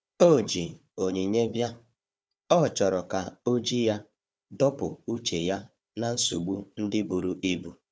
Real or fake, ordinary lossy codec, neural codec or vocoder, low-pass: fake; none; codec, 16 kHz, 4 kbps, FunCodec, trained on Chinese and English, 50 frames a second; none